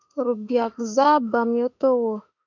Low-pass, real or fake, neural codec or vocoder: 7.2 kHz; fake; autoencoder, 48 kHz, 32 numbers a frame, DAC-VAE, trained on Japanese speech